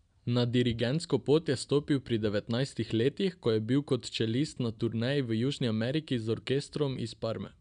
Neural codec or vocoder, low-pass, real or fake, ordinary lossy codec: none; 9.9 kHz; real; none